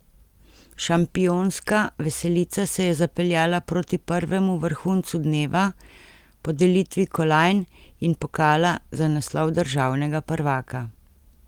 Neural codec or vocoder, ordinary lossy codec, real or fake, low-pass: none; Opus, 24 kbps; real; 19.8 kHz